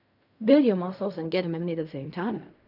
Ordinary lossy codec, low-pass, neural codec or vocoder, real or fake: none; 5.4 kHz; codec, 16 kHz in and 24 kHz out, 0.4 kbps, LongCat-Audio-Codec, fine tuned four codebook decoder; fake